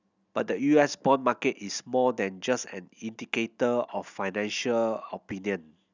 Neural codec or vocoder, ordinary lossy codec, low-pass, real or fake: none; none; 7.2 kHz; real